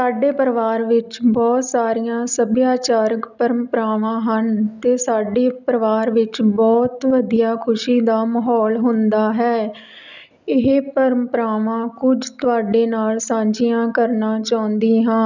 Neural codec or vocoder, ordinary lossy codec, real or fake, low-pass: none; none; real; 7.2 kHz